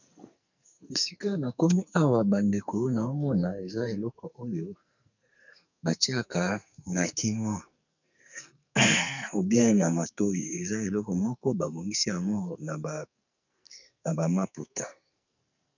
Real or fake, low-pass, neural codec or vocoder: fake; 7.2 kHz; codec, 44.1 kHz, 2.6 kbps, SNAC